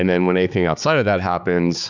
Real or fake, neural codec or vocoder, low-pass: fake; codec, 16 kHz, 4 kbps, X-Codec, HuBERT features, trained on balanced general audio; 7.2 kHz